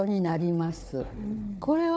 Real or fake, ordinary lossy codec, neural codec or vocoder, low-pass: fake; none; codec, 16 kHz, 4 kbps, FunCodec, trained on Chinese and English, 50 frames a second; none